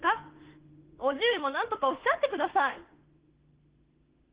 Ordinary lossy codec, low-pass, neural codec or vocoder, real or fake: Opus, 16 kbps; 3.6 kHz; autoencoder, 48 kHz, 32 numbers a frame, DAC-VAE, trained on Japanese speech; fake